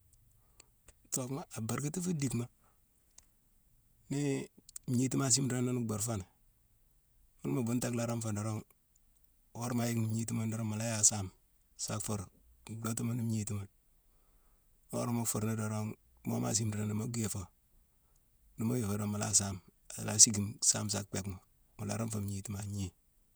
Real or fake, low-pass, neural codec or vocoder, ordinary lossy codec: fake; none; vocoder, 48 kHz, 128 mel bands, Vocos; none